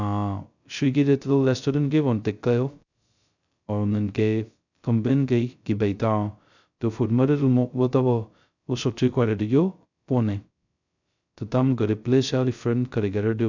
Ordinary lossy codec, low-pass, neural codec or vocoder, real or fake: none; 7.2 kHz; codec, 16 kHz, 0.2 kbps, FocalCodec; fake